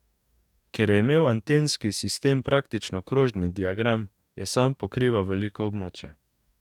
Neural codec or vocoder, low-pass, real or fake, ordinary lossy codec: codec, 44.1 kHz, 2.6 kbps, DAC; 19.8 kHz; fake; none